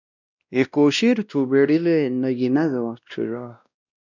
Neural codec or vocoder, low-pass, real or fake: codec, 16 kHz, 1 kbps, X-Codec, WavLM features, trained on Multilingual LibriSpeech; 7.2 kHz; fake